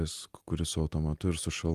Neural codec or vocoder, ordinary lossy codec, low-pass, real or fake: none; Opus, 24 kbps; 14.4 kHz; real